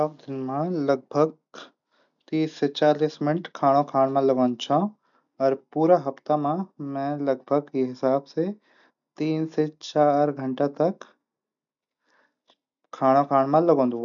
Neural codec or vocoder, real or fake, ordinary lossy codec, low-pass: none; real; none; 7.2 kHz